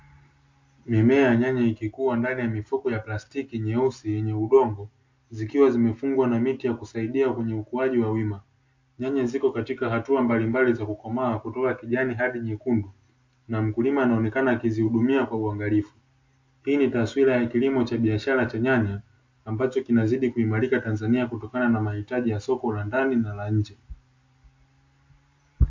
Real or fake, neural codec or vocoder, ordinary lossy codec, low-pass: real; none; MP3, 48 kbps; 7.2 kHz